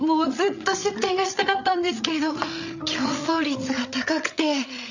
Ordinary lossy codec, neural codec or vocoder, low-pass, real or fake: none; codec, 16 kHz, 8 kbps, FreqCodec, larger model; 7.2 kHz; fake